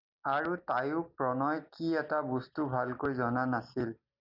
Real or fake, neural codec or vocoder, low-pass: real; none; 5.4 kHz